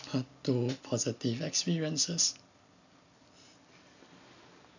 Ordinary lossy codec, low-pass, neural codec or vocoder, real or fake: none; 7.2 kHz; none; real